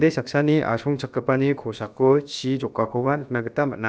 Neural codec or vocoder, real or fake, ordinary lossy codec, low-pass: codec, 16 kHz, about 1 kbps, DyCAST, with the encoder's durations; fake; none; none